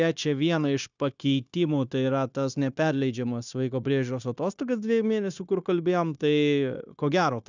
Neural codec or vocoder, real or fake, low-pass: codec, 16 kHz, 0.9 kbps, LongCat-Audio-Codec; fake; 7.2 kHz